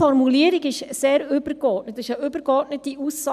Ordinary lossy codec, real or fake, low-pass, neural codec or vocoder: none; real; 14.4 kHz; none